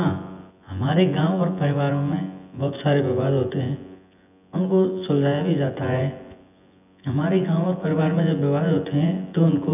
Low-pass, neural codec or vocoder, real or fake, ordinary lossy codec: 3.6 kHz; vocoder, 24 kHz, 100 mel bands, Vocos; fake; none